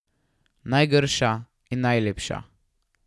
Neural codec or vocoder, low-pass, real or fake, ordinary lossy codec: none; none; real; none